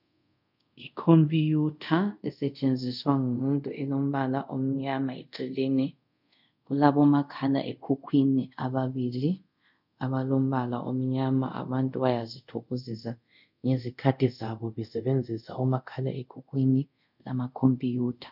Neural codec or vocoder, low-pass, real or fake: codec, 24 kHz, 0.5 kbps, DualCodec; 5.4 kHz; fake